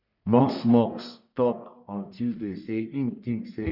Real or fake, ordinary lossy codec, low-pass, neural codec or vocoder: fake; none; 5.4 kHz; codec, 44.1 kHz, 1.7 kbps, Pupu-Codec